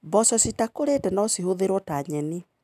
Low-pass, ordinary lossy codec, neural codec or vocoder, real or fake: 14.4 kHz; none; none; real